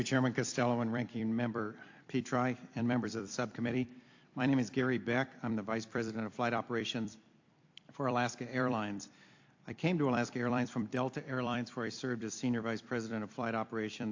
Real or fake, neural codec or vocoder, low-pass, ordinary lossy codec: fake; vocoder, 44.1 kHz, 128 mel bands every 256 samples, BigVGAN v2; 7.2 kHz; AAC, 48 kbps